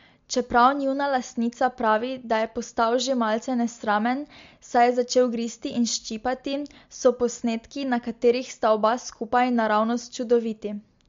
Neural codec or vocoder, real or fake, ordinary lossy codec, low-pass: none; real; MP3, 48 kbps; 7.2 kHz